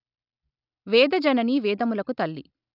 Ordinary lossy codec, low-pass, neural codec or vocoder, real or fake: none; 5.4 kHz; none; real